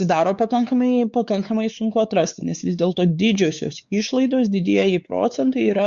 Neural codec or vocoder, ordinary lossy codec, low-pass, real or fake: codec, 16 kHz, 4 kbps, X-Codec, WavLM features, trained on Multilingual LibriSpeech; Opus, 64 kbps; 7.2 kHz; fake